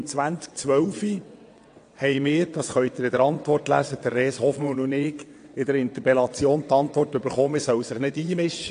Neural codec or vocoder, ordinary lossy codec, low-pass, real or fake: vocoder, 22.05 kHz, 80 mel bands, WaveNeXt; AAC, 48 kbps; 9.9 kHz; fake